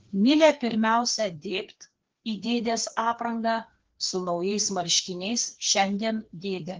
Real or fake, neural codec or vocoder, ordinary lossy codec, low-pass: fake; codec, 16 kHz, 2 kbps, FreqCodec, larger model; Opus, 16 kbps; 7.2 kHz